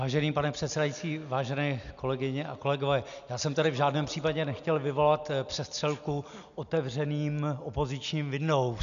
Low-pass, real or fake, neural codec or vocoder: 7.2 kHz; real; none